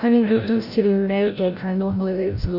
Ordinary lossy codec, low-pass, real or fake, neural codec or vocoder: none; 5.4 kHz; fake; codec, 16 kHz, 0.5 kbps, FreqCodec, larger model